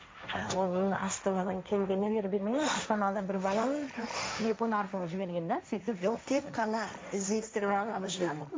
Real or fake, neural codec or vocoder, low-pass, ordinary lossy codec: fake; codec, 16 kHz, 1.1 kbps, Voila-Tokenizer; none; none